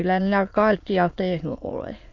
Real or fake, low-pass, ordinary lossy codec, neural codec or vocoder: fake; 7.2 kHz; AAC, 48 kbps; autoencoder, 22.05 kHz, a latent of 192 numbers a frame, VITS, trained on many speakers